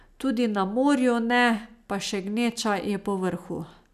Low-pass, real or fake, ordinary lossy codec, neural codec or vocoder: 14.4 kHz; real; none; none